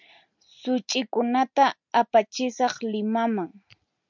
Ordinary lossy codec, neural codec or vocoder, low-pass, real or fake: MP3, 64 kbps; none; 7.2 kHz; real